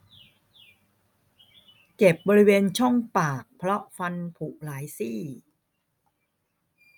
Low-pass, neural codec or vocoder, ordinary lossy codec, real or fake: none; none; none; real